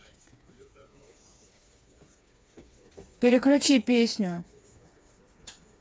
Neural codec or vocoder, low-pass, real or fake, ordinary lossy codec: codec, 16 kHz, 4 kbps, FreqCodec, smaller model; none; fake; none